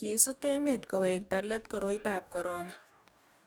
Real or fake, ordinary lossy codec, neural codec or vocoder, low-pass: fake; none; codec, 44.1 kHz, 2.6 kbps, DAC; none